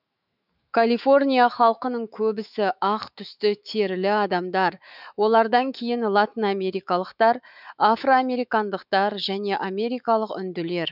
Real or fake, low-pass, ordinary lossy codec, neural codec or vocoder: fake; 5.4 kHz; none; autoencoder, 48 kHz, 128 numbers a frame, DAC-VAE, trained on Japanese speech